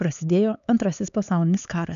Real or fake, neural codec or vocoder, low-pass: fake; codec, 16 kHz, 4 kbps, X-Codec, HuBERT features, trained on LibriSpeech; 7.2 kHz